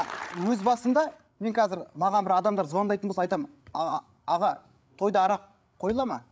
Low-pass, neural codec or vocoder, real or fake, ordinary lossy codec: none; codec, 16 kHz, 8 kbps, FreqCodec, larger model; fake; none